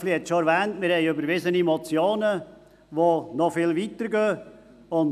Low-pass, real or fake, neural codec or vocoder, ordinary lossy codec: 14.4 kHz; real; none; none